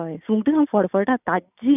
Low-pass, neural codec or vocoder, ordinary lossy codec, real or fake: 3.6 kHz; none; none; real